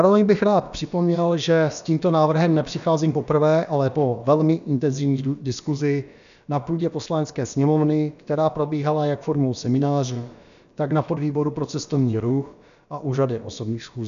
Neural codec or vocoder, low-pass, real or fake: codec, 16 kHz, about 1 kbps, DyCAST, with the encoder's durations; 7.2 kHz; fake